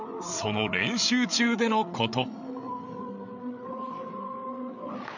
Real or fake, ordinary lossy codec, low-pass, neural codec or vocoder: fake; none; 7.2 kHz; codec, 16 kHz, 8 kbps, FreqCodec, larger model